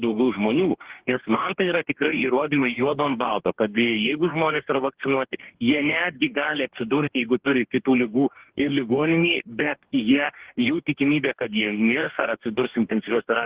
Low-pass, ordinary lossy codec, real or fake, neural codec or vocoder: 3.6 kHz; Opus, 16 kbps; fake; codec, 44.1 kHz, 2.6 kbps, DAC